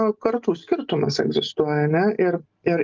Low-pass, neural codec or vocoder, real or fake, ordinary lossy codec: 7.2 kHz; none; real; Opus, 24 kbps